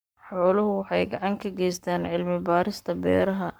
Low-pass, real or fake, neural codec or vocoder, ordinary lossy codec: none; fake; codec, 44.1 kHz, 7.8 kbps, Pupu-Codec; none